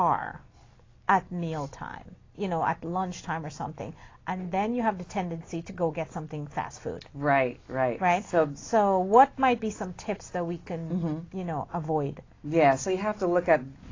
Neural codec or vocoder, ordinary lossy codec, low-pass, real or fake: none; AAC, 32 kbps; 7.2 kHz; real